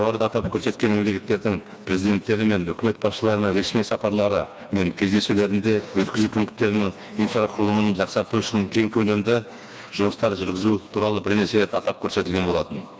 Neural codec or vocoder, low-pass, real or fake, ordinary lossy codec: codec, 16 kHz, 2 kbps, FreqCodec, smaller model; none; fake; none